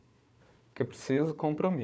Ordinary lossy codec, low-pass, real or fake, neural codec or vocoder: none; none; fake; codec, 16 kHz, 4 kbps, FunCodec, trained on Chinese and English, 50 frames a second